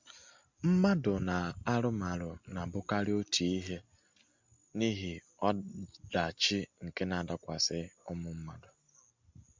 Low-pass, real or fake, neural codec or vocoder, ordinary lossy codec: 7.2 kHz; real; none; MP3, 64 kbps